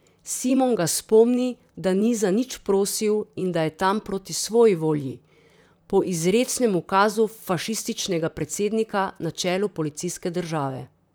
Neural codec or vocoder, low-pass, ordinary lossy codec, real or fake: vocoder, 44.1 kHz, 128 mel bands, Pupu-Vocoder; none; none; fake